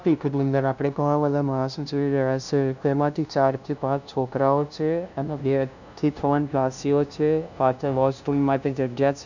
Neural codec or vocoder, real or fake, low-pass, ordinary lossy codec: codec, 16 kHz, 0.5 kbps, FunCodec, trained on LibriTTS, 25 frames a second; fake; 7.2 kHz; none